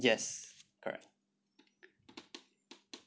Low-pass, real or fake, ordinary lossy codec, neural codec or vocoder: none; real; none; none